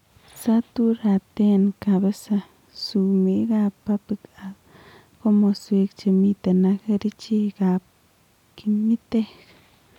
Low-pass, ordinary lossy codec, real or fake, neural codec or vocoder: 19.8 kHz; none; real; none